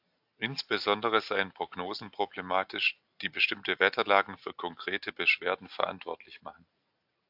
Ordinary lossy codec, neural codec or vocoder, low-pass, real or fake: AAC, 48 kbps; none; 5.4 kHz; real